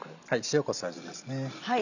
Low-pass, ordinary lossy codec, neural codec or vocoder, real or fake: 7.2 kHz; none; none; real